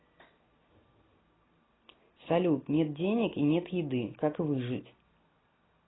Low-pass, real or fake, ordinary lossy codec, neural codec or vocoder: 7.2 kHz; real; AAC, 16 kbps; none